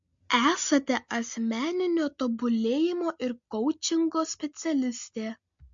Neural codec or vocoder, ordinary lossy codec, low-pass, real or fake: none; MP3, 48 kbps; 7.2 kHz; real